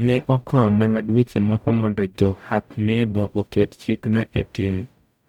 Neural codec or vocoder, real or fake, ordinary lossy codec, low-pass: codec, 44.1 kHz, 0.9 kbps, DAC; fake; none; 19.8 kHz